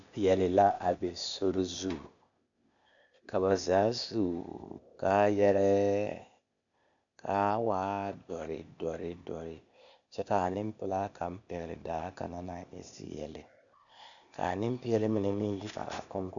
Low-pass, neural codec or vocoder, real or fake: 7.2 kHz; codec, 16 kHz, 0.8 kbps, ZipCodec; fake